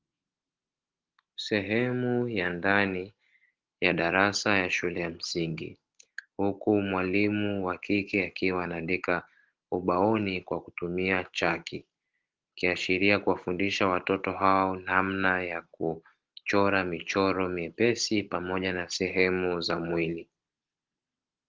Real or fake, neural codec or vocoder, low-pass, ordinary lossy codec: real; none; 7.2 kHz; Opus, 16 kbps